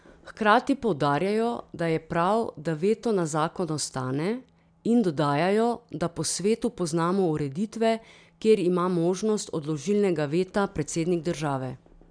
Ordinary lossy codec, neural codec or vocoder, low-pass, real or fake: none; none; 9.9 kHz; real